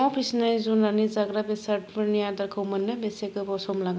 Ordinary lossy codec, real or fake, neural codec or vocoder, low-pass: none; real; none; none